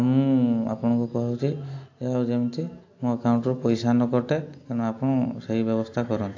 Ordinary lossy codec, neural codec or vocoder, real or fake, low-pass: AAC, 48 kbps; none; real; 7.2 kHz